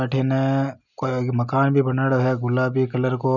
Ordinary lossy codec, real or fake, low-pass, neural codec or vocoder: none; real; 7.2 kHz; none